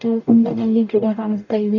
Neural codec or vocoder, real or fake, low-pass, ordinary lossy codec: codec, 44.1 kHz, 0.9 kbps, DAC; fake; 7.2 kHz; none